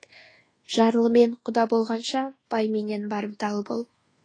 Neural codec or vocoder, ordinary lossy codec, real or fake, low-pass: codec, 24 kHz, 1.2 kbps, DualCodec; AAC, 32 kbps; fake; 9.9 kHz